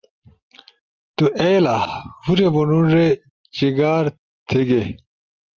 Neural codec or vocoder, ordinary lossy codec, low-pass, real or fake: none; Opus, 24 kbps; 7.2 kHz; real